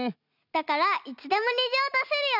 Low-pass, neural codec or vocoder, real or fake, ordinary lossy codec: 5.4 kHz; none; real; none